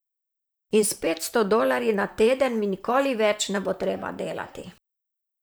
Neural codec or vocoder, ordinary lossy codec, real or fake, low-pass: vocoder, 44.1 kHz, 128 mel bands, Pupu-Vocoder; none; fake; none